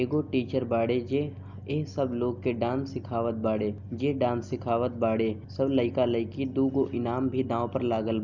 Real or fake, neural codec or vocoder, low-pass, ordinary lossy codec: real; none; 7.2 kHz; none